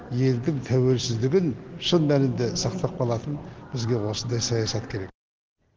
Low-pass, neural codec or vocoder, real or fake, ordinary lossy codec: 7.2 kHz; none; real; Opus, 16 kbps